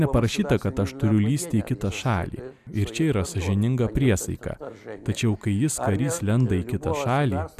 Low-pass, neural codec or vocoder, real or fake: 14.4 kHz; none; real